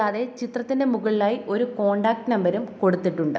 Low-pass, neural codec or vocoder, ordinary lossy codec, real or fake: none; none; none; real